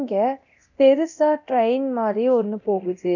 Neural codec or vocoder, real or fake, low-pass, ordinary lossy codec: codec, 24 kHz, 0.9 kbps, DualCodec; fake; 7.2 kHz; none